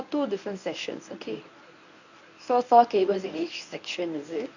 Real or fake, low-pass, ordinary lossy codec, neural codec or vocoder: fake; 7.2 kHz; none; codec, 24 kHz, 0.9 kbps, WavTokenizer, medium speech release version 1